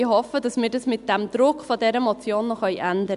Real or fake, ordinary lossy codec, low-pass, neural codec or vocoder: real; none; 10.8 kHz; none